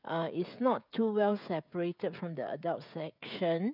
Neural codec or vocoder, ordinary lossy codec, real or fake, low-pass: codec, 16 kHz, 16 kbps, FreqCodec, smaller model; none; fake; 5.4 kHz